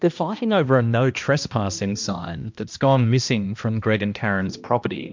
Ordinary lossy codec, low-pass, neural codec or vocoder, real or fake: MP3, 64 kbps; 7.2 kHz; codec, 16 kHz, 1 kbps, X-Codec, HuBERT features, trained on balanced general audio; fake